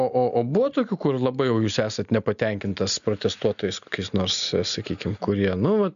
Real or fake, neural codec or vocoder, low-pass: real; none; 7.2 kHz